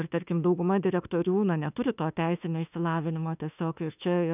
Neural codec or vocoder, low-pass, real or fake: autoencoder, 48 kHz, 32 numbers a frame, DAC-VAE, trained on Japanese speech; 3.6 kHz; fake